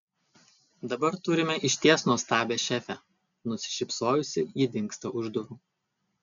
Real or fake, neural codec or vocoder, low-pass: real; none; 7.2 kHz